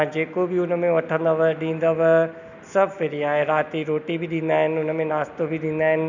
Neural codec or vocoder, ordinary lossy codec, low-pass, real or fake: none; none; 7.2 kHz; real